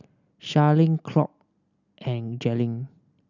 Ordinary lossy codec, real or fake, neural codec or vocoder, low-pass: none; real; none; 7.2 kHz